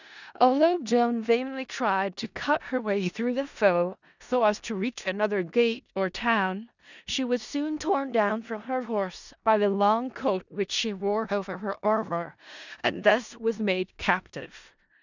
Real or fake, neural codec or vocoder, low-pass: fake; codec, 16 kHz in and 24 kHz out, 0.4 kbps, LongCat-Audio-Codec, four codebook decoder; 7.2 kHz